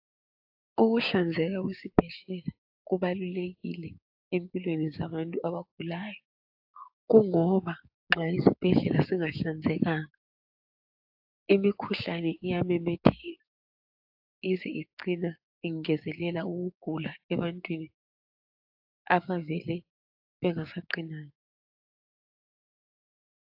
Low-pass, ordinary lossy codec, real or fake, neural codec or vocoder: 5.4 kHz; AAC, 48 kbps; fake; codec, 16 kHz, 6 kbps, DAC